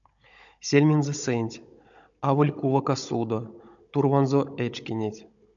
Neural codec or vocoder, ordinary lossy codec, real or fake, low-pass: codec, 16 kHz, 16 kbps, FunCodec, trained on Chinese and English, 50 frames a second; MP3, 96 kbps; fake; 7.2 kHz